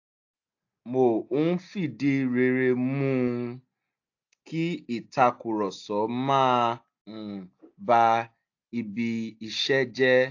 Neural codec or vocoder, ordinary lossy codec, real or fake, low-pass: none; AAC, 48 kbps; real; 7.2 kHz